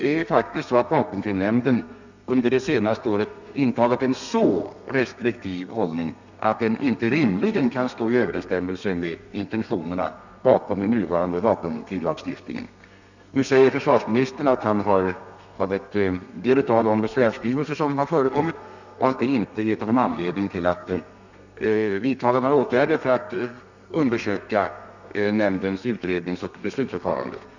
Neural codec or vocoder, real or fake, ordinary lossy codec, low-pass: codec, 32 kHz, 1.9 kbps, SNAC; fake; none; 7.2 kHz